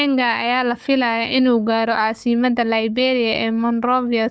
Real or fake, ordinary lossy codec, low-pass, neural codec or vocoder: fake; none; none; codec, 16 kHz, 4 kbps, FunCodec, trained on Chinese and English, 50 frames a second